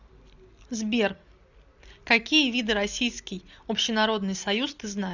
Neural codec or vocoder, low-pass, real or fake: none; 7.2 kHz; real